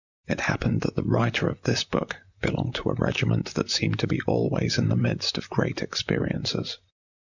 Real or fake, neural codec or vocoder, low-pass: fake; vocoder, 44.1 kHz, 128 mel bands, Pupu-Vocoder; 7.2 kHz